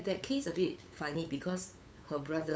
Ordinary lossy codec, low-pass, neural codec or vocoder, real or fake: none; none; codec, 16 kHz, 8 kbps, FunCodec, trained on LibriTTS, 25 frames a second; fake